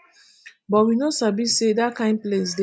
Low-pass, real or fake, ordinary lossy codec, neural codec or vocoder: none; real; none; none